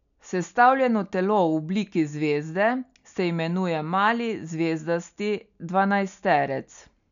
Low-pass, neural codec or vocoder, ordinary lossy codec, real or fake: 7.2 kHz; none; none; real